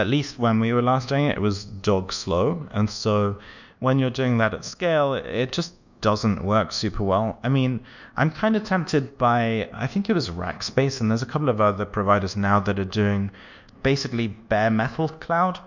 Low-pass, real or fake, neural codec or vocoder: 7.2 kHz; fake; codec, 24 kHz, 1.2 kbps, DualCodec